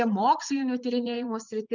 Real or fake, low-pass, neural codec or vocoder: fake; 7.2 kHz; vocoder, 44.1 kHz, 80 mel bands, Vocos